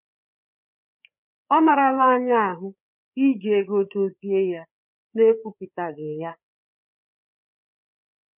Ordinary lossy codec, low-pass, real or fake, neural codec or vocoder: none; 3.6 kHz; fake; codec, 16 kHz, 4 kbps, FreqCodec, larger model